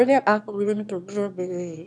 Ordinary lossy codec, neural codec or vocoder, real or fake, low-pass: none; autoencoder, 22.05 kHz, a latent of 192 numbers a frame, VITS, trained on one speaker; fake; none